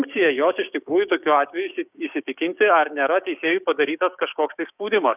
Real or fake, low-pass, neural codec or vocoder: fake; 3.6 kHz; codec, 16 kHz, 6 kbps, DAC